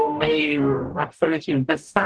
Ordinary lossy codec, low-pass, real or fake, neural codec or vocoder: Opus, 16 kbps; 9.9 kHz; fake; codec, 44.1 kHz, 0.9 kbps, DAC